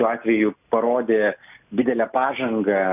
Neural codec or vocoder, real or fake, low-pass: none; real; 3.6 kHz